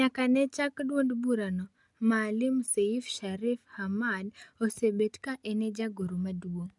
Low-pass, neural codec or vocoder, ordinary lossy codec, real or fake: 10.8 kHz; none; none; real